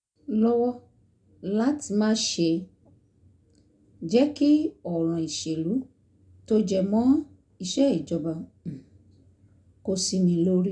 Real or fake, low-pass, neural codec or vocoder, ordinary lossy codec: real; 9.9 kHz; none; none